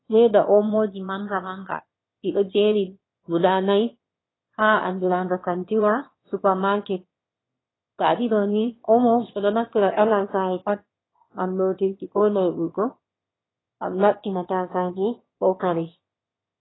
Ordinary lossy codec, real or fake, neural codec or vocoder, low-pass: AAC, 16 kbps; fake; autoencoder, 22.05 kHz, a latent of 192 numbers a frame, VITS, trained on one speaker; 7.2 kHz